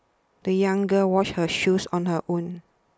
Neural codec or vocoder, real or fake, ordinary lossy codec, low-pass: none; real; none; none